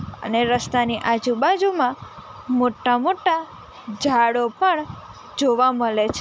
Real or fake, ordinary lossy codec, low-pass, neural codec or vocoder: real; none; none; none